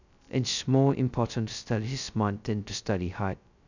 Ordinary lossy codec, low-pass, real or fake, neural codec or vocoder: none; 7.2 kHz; fake; codec, 16 kHz, 0.2 kbps, FocalCodec